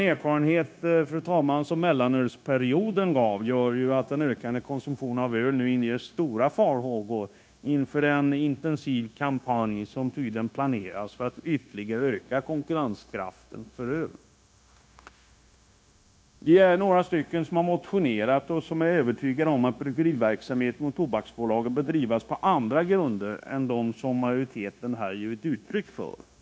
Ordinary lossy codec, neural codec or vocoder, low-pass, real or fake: none; codec, 16 kHz, 0.9 kbps, LongCat-Audio-Codec; none; fake